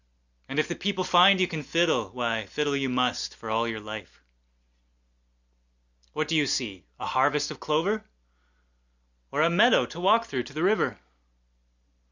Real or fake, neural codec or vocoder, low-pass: real; none; 7.2 kHz